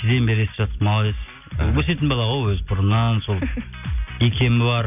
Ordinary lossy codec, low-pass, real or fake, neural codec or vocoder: none; 3.6 kHz; real; none